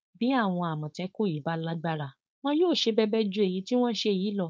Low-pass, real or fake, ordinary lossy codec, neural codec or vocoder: none; fake; none; codec, 16 kHz, 4.8 kbps, FACodec